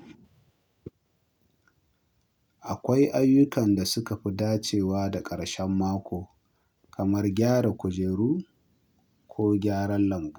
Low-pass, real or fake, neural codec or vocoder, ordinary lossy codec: none; real; none; none